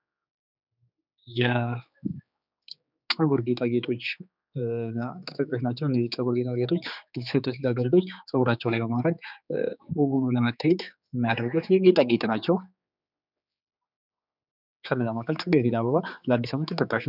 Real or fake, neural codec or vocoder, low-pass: fake; codec, 16 kHz, 4 kbps, X-Codec, HuBERT features, trained on general audio; 5.4 kHz